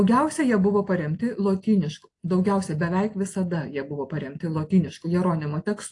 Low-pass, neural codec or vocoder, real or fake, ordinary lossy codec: 10.8 kHz; none; real; AAC, 48 kbps